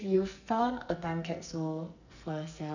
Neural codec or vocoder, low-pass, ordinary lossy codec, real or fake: codec, 44.1 kHz, 2.6 kbps, SNAC; 7.2 kHz; none; fake